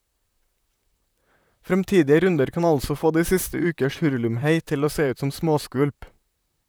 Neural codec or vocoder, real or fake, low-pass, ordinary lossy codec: vocoder, 44.1 kHz, 128 mel bands, Pupu-Vocoder; fake; none; none